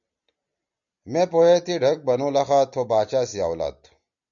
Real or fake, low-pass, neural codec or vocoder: real; 7.2 kHz; none